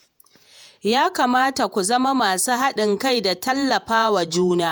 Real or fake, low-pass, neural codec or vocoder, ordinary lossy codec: fake; none; vocoder, 48 kHz, 128 mel bands, Vocos; none